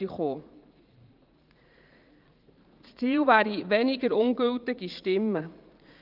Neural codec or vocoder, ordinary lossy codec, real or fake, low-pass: vocoder, 44.1 kHz, 80 mel bands, Vocos; Opus, 24 kbps; fake; 5.4 kHz